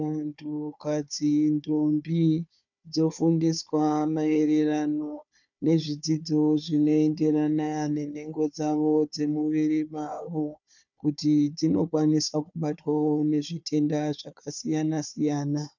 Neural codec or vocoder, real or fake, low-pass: codec, 16 kHz, 4 kbps, FunCodec, trained on Chinese and English, 50 frames a second; fake; 7.2 kHz